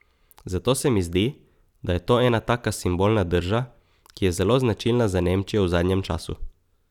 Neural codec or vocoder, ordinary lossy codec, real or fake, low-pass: none; none; real; 19.8 kHz